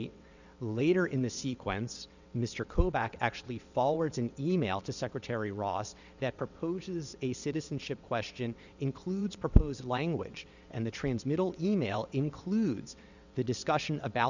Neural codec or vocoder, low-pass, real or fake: vocoder, 22.05 kHz, 80 mel bands, WaveNeXt; 7.2 kHz; fake